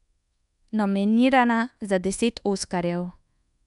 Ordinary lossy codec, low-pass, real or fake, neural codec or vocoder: none; 10.8 kHz; fake; codec, 24 kHz, 1.2 kbps, DualCodec